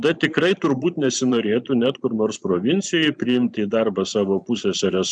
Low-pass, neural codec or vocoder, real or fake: 9.9 kHz; vocoder, 44.1 kHz, 128 mel bands every 512 samples, BigVGAN v2; fake